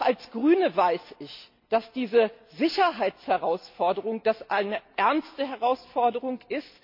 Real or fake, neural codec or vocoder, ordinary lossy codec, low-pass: real; none; MP3, 32 kbps; 5.4 kHz